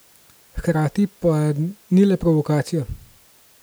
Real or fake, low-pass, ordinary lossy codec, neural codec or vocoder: real; none; none; none